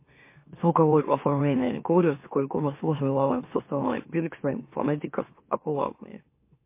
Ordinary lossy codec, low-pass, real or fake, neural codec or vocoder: MP3, 24 kbps; 3.6 kHz; fake; autoencoder, 44.1 kHz, a latent of 192 numbers a frame, MeloTTS